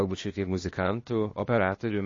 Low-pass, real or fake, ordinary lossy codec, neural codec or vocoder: 7.2 kHz; fake; MP3, 32 kbps; codec, 16 kHz, 0.8 kbps, ZipCodec